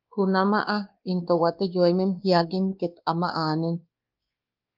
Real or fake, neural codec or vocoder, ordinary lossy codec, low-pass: fake; codec, 16 kHz, 2 kbps, X-Codec, WavLM features, trained on Multilingual LibriSpeech; Opus, 32 kbps; 5.4 kHz